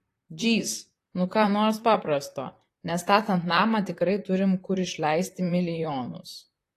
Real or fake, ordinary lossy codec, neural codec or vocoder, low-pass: fake; AAC, 48 kbps; vocoder, 44.1 kHz, 128 mel bands, Pupu-Vocoder; 14.4 kHz